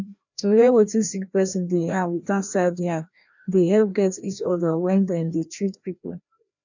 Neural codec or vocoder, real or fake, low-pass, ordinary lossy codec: codec, 16 kHz, 1 kbps, FreqCodec, larger model; fake; 7.2 kHz; none